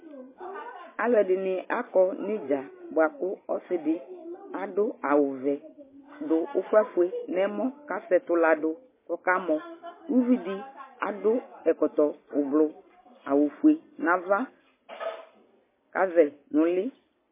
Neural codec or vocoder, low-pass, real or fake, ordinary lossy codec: none; 3.6 kHz; real; MP3, 16 kbps